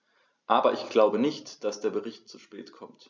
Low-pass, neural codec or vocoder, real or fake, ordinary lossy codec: none; none; real; none